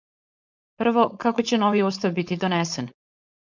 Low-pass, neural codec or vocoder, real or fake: 7.2 kHz; codec, 16 kHz, 4.8 kbps, FACodec; fake